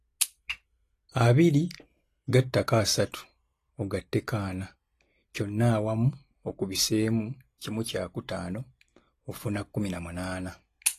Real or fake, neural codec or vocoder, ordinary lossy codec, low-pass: real; none; AAC, 48 kbps; 14.4 kHz